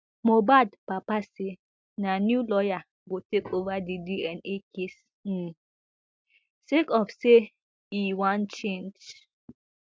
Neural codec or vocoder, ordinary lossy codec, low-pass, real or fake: none; none; none; real